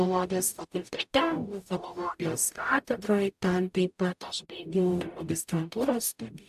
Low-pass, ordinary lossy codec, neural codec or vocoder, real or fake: 14.4 kHz; AAC, 96 kbps; codec, 44.1 kHz, 0.9 kbps, DAC; fake